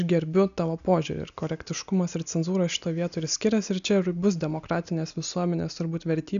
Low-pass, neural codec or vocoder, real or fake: 7.2 kHz; none; real